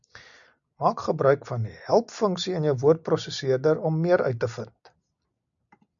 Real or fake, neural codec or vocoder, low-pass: real; none; 7.2 kHz